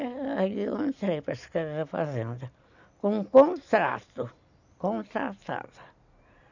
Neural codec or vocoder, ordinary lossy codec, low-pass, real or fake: none; none; 7.2 kHz; real